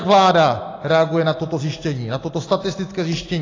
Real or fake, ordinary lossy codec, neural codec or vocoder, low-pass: real; AAC, 32 kbps; none; 7.2 kHz